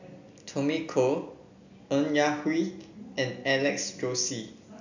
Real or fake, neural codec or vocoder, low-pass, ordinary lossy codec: real; none; 7.2 kHz; none